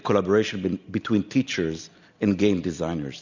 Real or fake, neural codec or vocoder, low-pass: real; none; 7.2 kHz